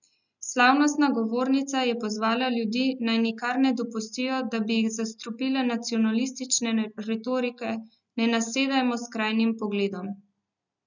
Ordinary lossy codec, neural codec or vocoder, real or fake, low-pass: none; none; real; 7.2 kHz